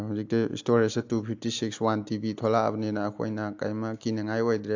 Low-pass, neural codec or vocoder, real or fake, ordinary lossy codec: 7.2 kHz; none; real; AAC, 48 kbps